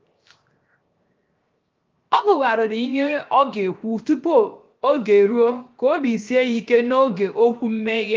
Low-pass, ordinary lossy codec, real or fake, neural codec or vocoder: 7.2 kHz; Opus, 24 kbps; fake; codec, 16 kHz, 0.7 kbps, FocalCodec